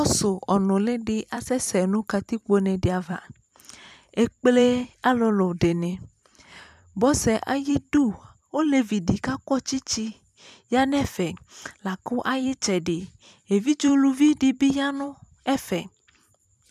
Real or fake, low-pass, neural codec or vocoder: fake; 14.4 kHz; vocoder, 44.1 kHz, 128 mel bands every 256 samples, BigVGAN v2